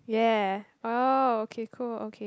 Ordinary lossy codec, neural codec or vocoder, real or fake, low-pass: none; none; real; none